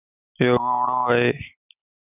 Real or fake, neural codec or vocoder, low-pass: real; none; 3.6 kHz